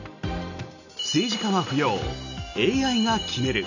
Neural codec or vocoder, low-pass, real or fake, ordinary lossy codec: none; 7.2 kHz; real; none